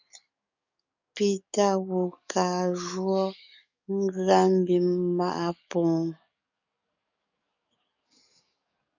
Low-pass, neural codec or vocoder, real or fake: 7.2 kHz; codec, 44.1 kHz, 7.8 kbps, DAC; fake